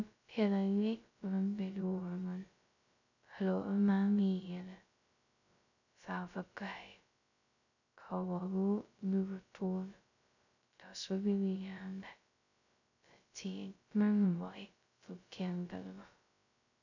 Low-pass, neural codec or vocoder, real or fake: 7.2 kHz; codec, 16 kHz, 0.2 kbps, FocalCodec; fake